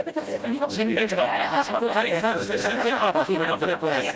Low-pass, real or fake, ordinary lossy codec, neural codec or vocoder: none; fake; none; codec, 16 kHz, 0.5 kbps, FreqCodec, smaller model